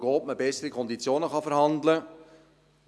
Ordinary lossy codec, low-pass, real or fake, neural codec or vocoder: none; none; real; none